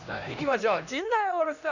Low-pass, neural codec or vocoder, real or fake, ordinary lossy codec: 7.2 kHz; codec, 16 kHz, 1 kbps, X-Codec, HuBERT features, trained on LibriSpeech; fake; none